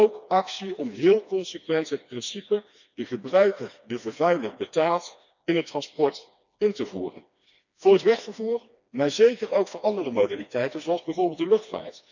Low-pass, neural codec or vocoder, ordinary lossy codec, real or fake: 7.2 kHz; codec, 16 kHz, 2 kbps, FreqCodec, smaller model; none; fake